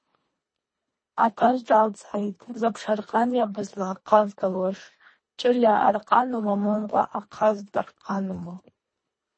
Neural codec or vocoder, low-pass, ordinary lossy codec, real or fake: codec, 24 kHz, 1.5 kbps, HILCodec; 9.9 kHz; MP3, 32 kbps; fake